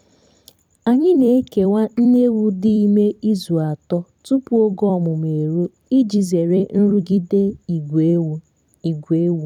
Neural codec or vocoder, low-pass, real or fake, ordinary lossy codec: vocoder, 44.1 kHz, 128 mel bands every 256 samples, BigVGAN v2; 19.8 kHz; fake; none